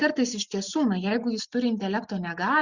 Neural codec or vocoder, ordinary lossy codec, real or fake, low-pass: none; Opus, 64 kbps; real; 7.2 kHz